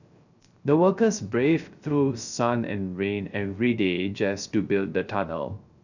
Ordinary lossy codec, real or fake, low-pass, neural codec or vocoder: none; fake; 7.2 kHz; codec, 16 kHz, 0.3 kbps, FocalCodec